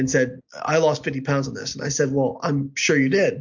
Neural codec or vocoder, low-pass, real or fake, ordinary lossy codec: none; 7.2 kHz; real; MP3, 48 kbps